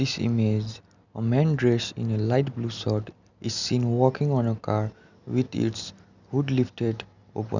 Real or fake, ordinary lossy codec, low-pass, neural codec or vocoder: real; none; 7.2 kHz; none